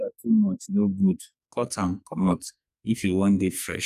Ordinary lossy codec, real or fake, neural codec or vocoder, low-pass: none; fake; codec, 44.1 kHz, 2.6 kbps, SNAC; 14.4 kHz